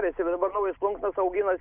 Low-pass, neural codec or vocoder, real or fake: 3.6 kHz; none; real